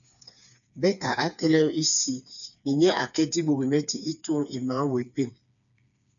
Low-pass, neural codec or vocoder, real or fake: 7.2 kHz; codec, 16 kHz, 4 kbps, FreqCodec, smaller model; fake